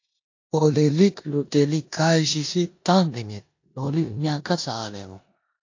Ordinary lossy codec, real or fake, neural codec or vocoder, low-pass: AAC, 48 kbps; fake; codec, 16 kHz in and 24 kHz out, 0.9 kbps, LongCat-Audio-Codec, four codebook decoder; 7.2 kHz